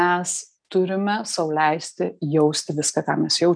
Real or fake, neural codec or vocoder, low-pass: real; none; 9.9 kHz